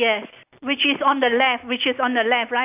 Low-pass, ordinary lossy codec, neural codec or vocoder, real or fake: 3.6 kHz; AAC, 32 kbps; none; real